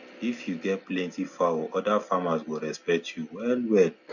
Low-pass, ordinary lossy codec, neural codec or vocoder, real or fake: 7.2 kHz; none; none; real